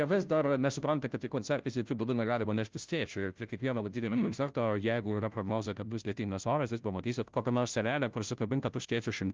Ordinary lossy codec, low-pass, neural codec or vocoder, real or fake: Opus, 24 kbps; 7.2 kHz; codec, 16 kHz, 0.5 kbps, FunCodec, trained on Chinese and English, 25 frames a second; fake